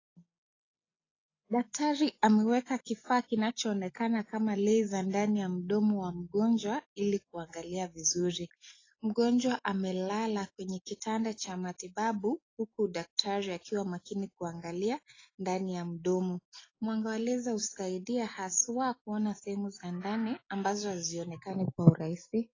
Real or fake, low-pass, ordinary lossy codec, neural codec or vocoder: real; 7.2 kHz; AAC, 32 kbps; none